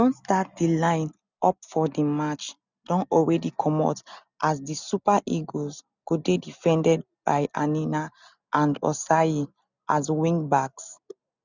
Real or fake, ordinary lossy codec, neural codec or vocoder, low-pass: real; none; none; 7.2 kHz